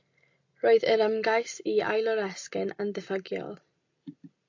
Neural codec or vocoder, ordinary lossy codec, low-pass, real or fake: none; AAC, 48 kbps; 7.2 kHz; real